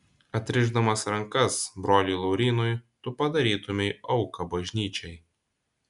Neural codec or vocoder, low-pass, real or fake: none; 10.8 kHz; real